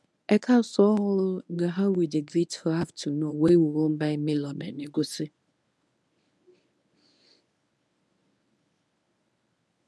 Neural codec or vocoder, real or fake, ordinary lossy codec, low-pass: codec, 24 kHz, 0.9 kbps, WavTokenizer, medium speech release version 1; fake; none; none